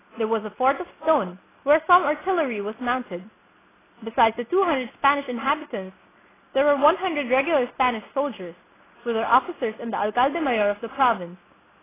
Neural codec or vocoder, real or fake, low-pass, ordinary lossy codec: none; real; 3.6 kHz; AAC, 16 kbps